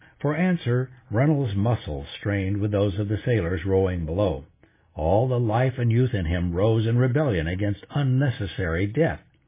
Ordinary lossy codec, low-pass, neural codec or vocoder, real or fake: MP3, 16 kbps; 3.6 kHz; none; real